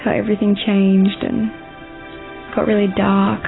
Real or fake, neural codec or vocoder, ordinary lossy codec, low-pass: real; none; AAC, 16 kbps; 7.2 kHz